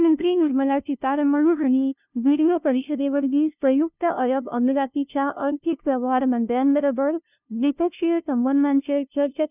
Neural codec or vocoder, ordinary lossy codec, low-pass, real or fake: codec, 16 kHz, 0.5 kbps, FunCodec, trained on LibriTTS, 25 frames a second; none; 3.6 kHz; fake